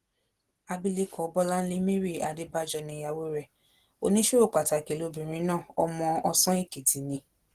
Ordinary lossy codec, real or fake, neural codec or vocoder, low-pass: Opus, 16 kbps; real; none; 14.4 kHz